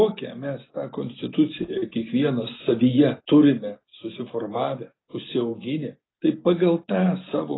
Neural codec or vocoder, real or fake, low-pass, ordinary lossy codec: none; real; 7.2 kHz; AAC, 16 kbps